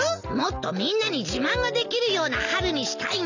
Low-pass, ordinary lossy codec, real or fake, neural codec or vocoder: 7.2 kHz; none; real; none